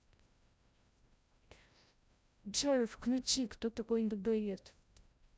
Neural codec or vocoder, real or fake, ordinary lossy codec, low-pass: codec, 16 kHz, 0.5 kbps, FreqCodec, larger model; fake; none; none